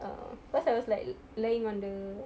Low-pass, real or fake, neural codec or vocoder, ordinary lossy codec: none; real; none; none